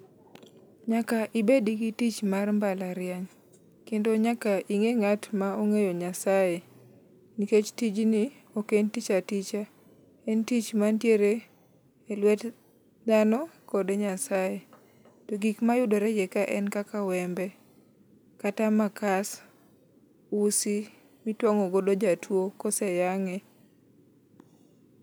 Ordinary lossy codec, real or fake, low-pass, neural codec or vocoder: none; real; none; none